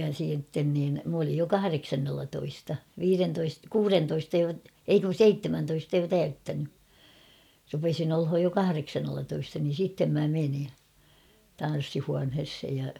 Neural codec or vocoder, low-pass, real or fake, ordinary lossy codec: none; 19.8 kHz; real; none